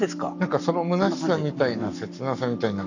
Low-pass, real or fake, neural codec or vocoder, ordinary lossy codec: 7.2 kHz; fake; codec, 44.1 kHz, 7.8 kbps, Pupu-Codec; none